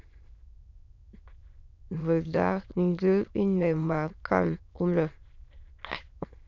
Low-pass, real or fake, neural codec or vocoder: 7.2 kHz; fake; autoencoder, 22.05 kHz, a latent of 192 numbers a frame, VITS, trained on many speakers